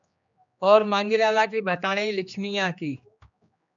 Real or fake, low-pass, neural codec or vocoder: fake; 7.2 kHz; codec, 16 kHz, 2 kbps, X-Codec, HuBERT features, trained on general audio